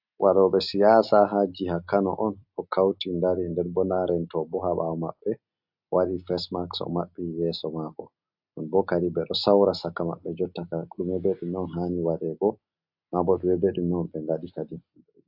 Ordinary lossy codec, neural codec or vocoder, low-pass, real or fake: AAC, 48 kbps; none; 5.4 kHz; real